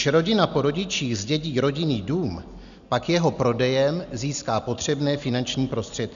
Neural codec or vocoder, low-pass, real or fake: none; 7.2 kHz; real